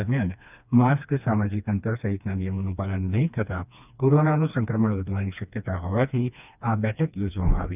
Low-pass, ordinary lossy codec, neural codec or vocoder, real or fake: 3.6 kHz; none; codec, 16 kHz, 2 kbps, FreqCodec, smaller model; fake